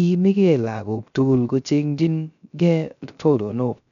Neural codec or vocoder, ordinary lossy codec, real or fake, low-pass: codec, 16 kHz, 0.3 kbps, FocalCodec; none; fake; 7.2 kHz